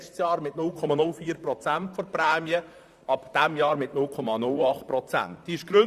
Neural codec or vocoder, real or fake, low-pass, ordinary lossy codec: vocoder, 44.1 kHz, 128 mel bands, Pupu-Vocoder; fake; 14.4 kHz; Opus, 64 kbps